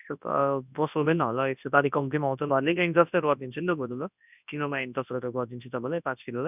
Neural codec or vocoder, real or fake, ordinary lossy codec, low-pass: codec, 24 kHz, 0.9 kbps, WavTokenizer, large speech release; fake; none; 3.6 kHz